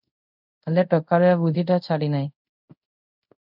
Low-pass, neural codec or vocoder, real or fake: 5.4 kHz; codec, 24 kHz, 0.5 kbps, DualCodec; fake